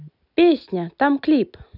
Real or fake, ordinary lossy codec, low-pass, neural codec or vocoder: real; none; 5.4 kHz; none